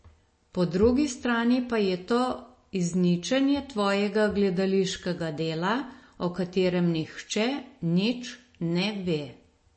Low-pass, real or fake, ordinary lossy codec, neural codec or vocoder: 9.9 kHz; real; MP3, 32 kbps; none